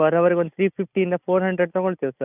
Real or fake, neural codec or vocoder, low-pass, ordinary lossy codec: real; none; 3.6 kHz; none